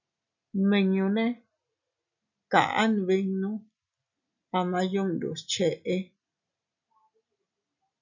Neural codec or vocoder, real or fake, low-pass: none; real; 7.2 kHz